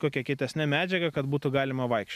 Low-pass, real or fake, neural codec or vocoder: 14.4 kHz; real; none